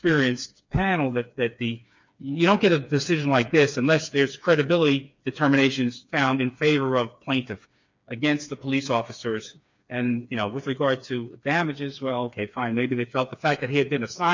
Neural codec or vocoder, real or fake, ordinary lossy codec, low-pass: codec, 16 kHz, 4 kbps, FreqCodec, smaller model; fake; MP3, 64 kbps; 7.2 kHz